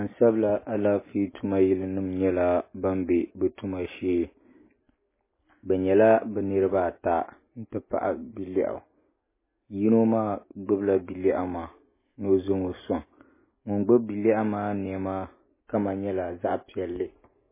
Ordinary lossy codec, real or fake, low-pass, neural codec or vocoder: MP3, 16 kbps; real; 3.6 kHz; none